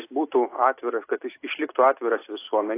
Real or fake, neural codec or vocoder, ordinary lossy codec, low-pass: real; none; AAC, 24 kbps; 3.6 kHz